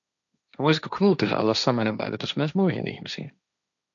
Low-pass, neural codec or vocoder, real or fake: 7.2 kHz; codec, 16 kHz, 1.1 kbps, Voila-Tokenizer; fake